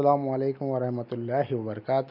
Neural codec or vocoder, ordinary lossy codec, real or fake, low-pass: none; none; real; 5.4 kHz